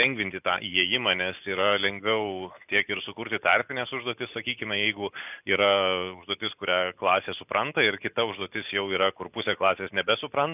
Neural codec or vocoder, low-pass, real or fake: none; 3.6 kHz; real